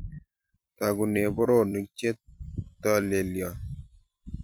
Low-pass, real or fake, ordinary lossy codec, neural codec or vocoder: none; fake; none; vocoder, 44.1 kHz, 128 mel bands every 256 samples, BigVGAN v2